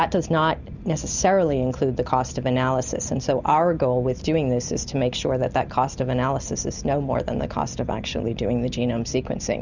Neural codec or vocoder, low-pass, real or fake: none; 7.2 kHz; real